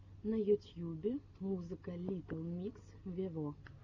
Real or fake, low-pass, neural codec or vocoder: real; 7.2 kHz; none